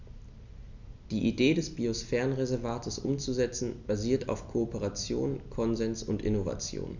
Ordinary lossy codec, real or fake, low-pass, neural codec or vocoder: none; real; 7.2 kHz; none